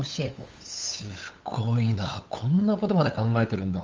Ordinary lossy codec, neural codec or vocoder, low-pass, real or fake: Opus, 32 kbps; codec, 16 kHz, 8 kbps, FunCodec, trained on LibriTTS, 25 frames a second; 7.2 kHz; fake